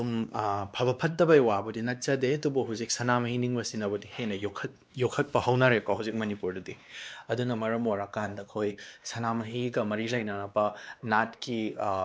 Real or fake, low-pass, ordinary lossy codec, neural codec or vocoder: fake; none; none; codec, 16 kHz, 2 kbps, X-Codec, WavLM features, trained on Multilingual LibriSpeech